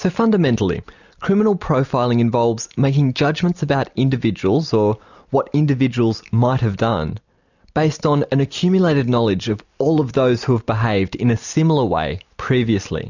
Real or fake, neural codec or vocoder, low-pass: real; none; 7.2 kHz